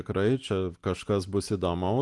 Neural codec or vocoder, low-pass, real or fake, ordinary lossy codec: none; 10.8 kHz; real; Opus, 24 kbps